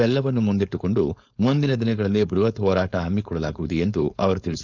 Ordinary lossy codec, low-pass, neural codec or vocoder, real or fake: none; 7.2 kHz; codec, 16 kHz, 4.8 kbps, FACodec; fake